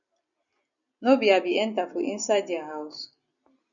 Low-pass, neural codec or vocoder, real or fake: 7.2 kHz; none; real